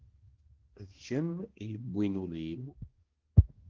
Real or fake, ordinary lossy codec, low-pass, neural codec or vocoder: fake; Opus, 16 kbps; 7.2 kHz; codec, 16 kHz, 1 kbps, X-Codec, HuBERT features, trained on balanced general audio